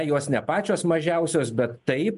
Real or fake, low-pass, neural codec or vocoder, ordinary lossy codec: real; 10.8 kHz; none; MP3, 64 kbps